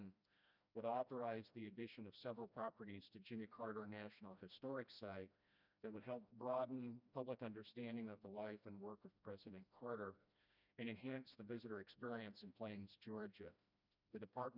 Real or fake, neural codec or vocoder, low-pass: fake; codec, 16 kHz, 1 kbps, FreqCodec, smaller model; 5.4 kHz